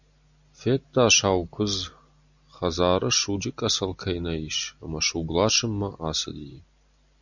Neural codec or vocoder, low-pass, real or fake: none; 7.2 kHz; real